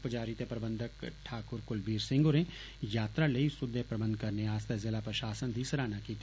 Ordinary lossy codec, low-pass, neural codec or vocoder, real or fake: none; none; none; real